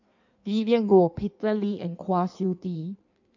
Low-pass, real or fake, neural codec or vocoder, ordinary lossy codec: 7.2 kHz; fake; codec, 16 kHz in and 24 kHz out, 1.1 kbps, FireRedTTS-2 codec; none